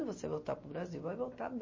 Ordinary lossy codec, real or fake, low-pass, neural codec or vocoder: MP3, 32 kbps; real; 7.2 kHz; none